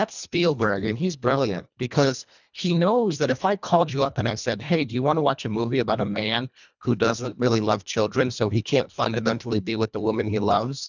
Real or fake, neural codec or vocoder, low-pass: fake; codec, 24 kHz, 1.5 kbps, HILCodec; 7.2 kHz